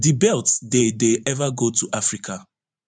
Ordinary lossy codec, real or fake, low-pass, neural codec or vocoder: none; fake; 9.9 kHz; vocoder, 44.1 kHz, 128 mel bands every 512 samples, BigVGAN v2